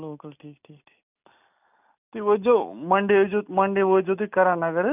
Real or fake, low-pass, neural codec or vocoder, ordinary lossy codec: real; 3.6 kHz; none; none